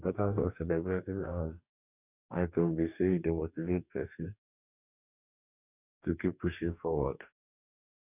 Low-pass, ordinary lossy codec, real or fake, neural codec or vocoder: 3.6 kHz; none; fake; codec, 44.1 kHz, 2.6 kbps, DAC